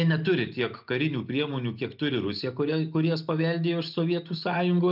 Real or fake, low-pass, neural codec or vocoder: real; 5.4 kHz; none